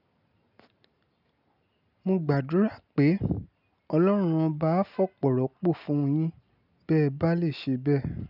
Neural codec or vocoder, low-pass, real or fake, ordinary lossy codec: none; 5.4 kHz; real; none